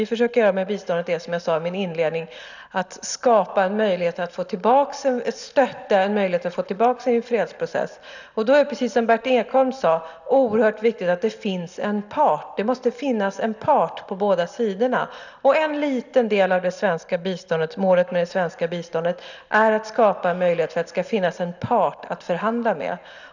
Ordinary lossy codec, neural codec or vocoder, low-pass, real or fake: none; none; 7.2 kHz; real